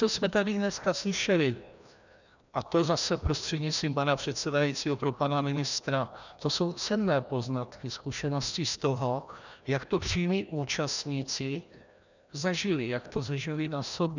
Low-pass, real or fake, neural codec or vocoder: 7.2 kHz; fake; codec, 16 kHz, 1 kbps, FreqCodec, larger model